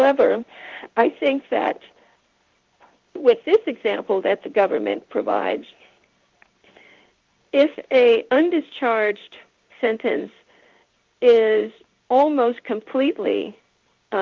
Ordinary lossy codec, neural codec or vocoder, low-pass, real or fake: Opus, 32 kbps; codec, 16 kHz in and 24 kHz out, 1 kbps, XY-Tokenizer; 7.2 kHz; fake